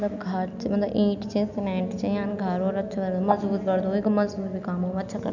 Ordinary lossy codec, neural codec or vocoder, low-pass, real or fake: none; none; 7.2 kHz; real